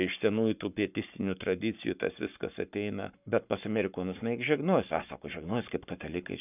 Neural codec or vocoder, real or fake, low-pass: codec, 44.1 kHz, 7.8 kbps, Pupu-Codec; fake; 3.6 kHz